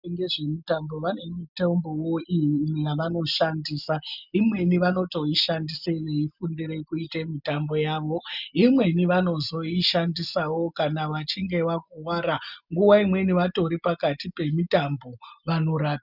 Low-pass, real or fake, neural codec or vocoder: 5.4 kHz; real; none